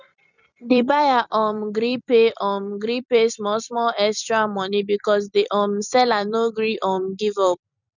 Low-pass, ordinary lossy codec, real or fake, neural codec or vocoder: 7.2 kHz; none; fake; vocoder, 44.1 kHz, 128 mel bands every 256 samples, BigVGAN v2